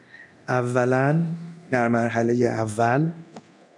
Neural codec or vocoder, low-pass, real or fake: codec, 24 kHz, 0.9 kbps, DualCodec; 10.8 kHz; fake